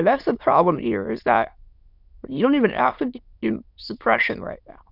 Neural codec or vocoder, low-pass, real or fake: autoencoder, 22.05 kHz, a latent of 192 numbers a frame, VITS, trained on many speakers; 5.4 kHz; fake